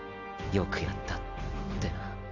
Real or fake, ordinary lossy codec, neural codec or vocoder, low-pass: real; none; none; 7.2 kHz